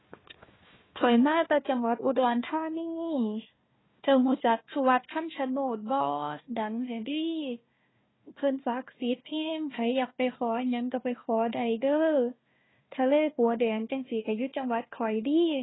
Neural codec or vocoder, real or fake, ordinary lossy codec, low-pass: codec, 16 kHz, 1 kbps, FunCodec, trained on Chinese and English, 50 frames a second; fake; AAC, 16 kbps; 7.2 kHz